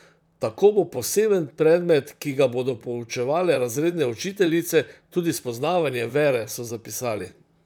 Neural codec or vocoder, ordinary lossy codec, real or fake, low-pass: vocoder, 44.1 kHz, 128 mel bands, Pupu-Vocoder; none; fake; 19.8 kHz